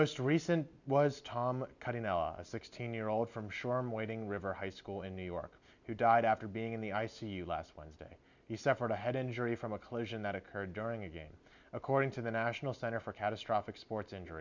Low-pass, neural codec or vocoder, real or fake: 7.2 kHz; none; real